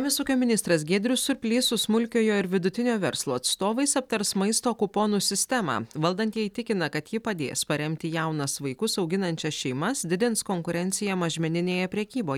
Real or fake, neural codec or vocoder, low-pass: real; none; 19.8 kHz